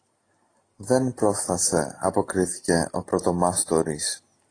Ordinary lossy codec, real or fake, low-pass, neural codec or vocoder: AAC, 32 kbps; real; 9.9 kHz; none